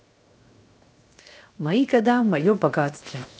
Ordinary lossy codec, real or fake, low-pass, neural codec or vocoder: none; fake; none; codec, 16 kHz, 0.7 kbps, FocalCodec